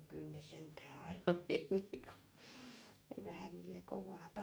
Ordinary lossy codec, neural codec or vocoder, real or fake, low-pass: none; codec, 44.1 kHz, 2.6 kbps, DAC; fake; none